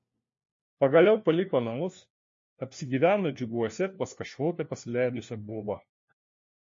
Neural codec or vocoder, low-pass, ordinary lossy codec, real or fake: codec, 16 kHz, 1 kbps, FunCodec, trained on LibriTTS, 50 frames a second; 7.2 kHz; MP3, 32 kbps; fake